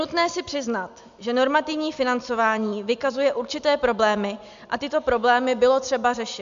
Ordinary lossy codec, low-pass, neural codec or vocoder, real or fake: MP3, 64 kbps; 7.2 kHz; none; real